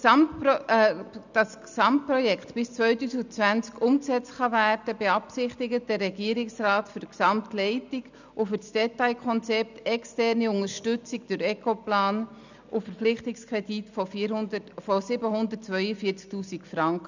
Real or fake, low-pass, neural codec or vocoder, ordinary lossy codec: real; 7.2 kHz; none; none